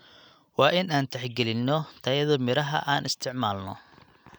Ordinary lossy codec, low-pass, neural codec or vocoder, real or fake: none; none; none; real